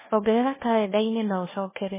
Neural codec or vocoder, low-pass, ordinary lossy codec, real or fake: codec, 16 kHz, 1 kbps, FunCodec, trained on LibriTTS, 50 frames a second; 3.6 kHz; MP3, 16 kbps; fake